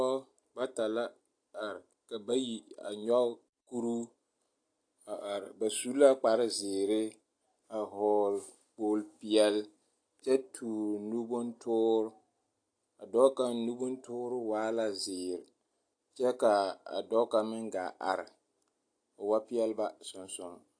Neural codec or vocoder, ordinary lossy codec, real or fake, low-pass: none; AAC, 48 kbps; real; 9.9 kHz